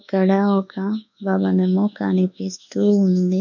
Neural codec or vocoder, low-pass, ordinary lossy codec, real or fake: codec, 24 kHz, 1.2 kbps, DualCodec; 7.2 kHz; none; fake